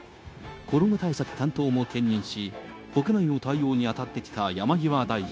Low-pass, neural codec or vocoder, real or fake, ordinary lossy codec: none; codec, 16 kHz, 0.9 kbps, LongCat-Audio-Codec; fake; none